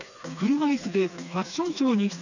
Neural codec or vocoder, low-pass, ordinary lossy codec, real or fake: codec, 16 kHz, 2 kbps, FreqCodec, smaller model; 7.2 kHz; none; fake